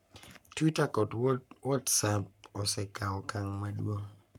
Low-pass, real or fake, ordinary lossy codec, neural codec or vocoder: 19.8 kHz; fake; none; codec, 44.1 kHz, 7.8 kbps, Pupu-Codec